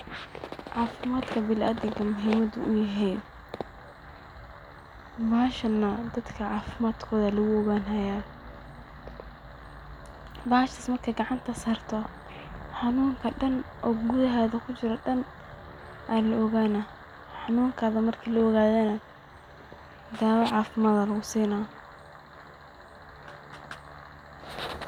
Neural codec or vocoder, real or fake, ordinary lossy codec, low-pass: none; real; none; 19.8 kHz